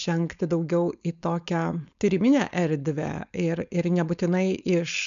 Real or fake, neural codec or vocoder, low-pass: fake; codec, 16 kHz, 4.8 kbps, FACodec; 7.2 kHz